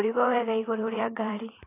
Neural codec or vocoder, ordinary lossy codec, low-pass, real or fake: vocoder, 44.1 kHz, 128 mel bands every 512 samples, BigVGAN v2; AAC, 16 kbps; 3.6 kHz; fake